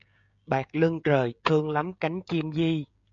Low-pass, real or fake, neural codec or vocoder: 7.2 kHz; fake; codec, 16 kHz, 16 kbps, FreqCodec, smaller model